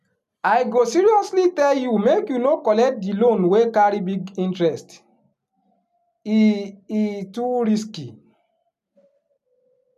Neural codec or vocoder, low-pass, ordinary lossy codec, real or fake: none; 14.4 kHz; none; real